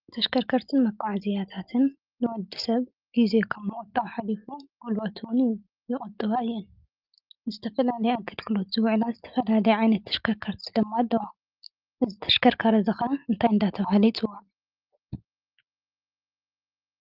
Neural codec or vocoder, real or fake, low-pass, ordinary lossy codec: none; real; 5.4 kHz; Opus, 24 kbps